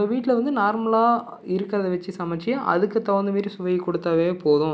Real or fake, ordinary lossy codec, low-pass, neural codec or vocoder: real; none; none; none